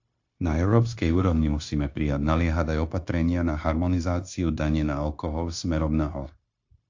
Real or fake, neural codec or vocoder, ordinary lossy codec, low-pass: fake; codec, 16 kHz, 0.9 kbps, LongCat-Audio-Codec; AAC, 48 kbps; 7.2 kHz